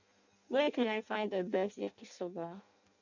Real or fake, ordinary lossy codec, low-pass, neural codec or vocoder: fake; none; 7.2 kHz; codec, 16 kHz in and 24 kHz out, 0.6 kbps, FireRedTTS-2 codec